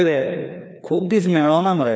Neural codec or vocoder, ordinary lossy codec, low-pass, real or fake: codec, 16 kHz, 2 kbps, FreqCodec, larger model; none; none; fake